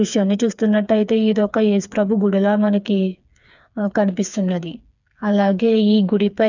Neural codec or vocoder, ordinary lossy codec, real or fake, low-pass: codec, 16 kHz, 4 kbps, FreqCodec, smaller model; none; fake; 7.2 kHz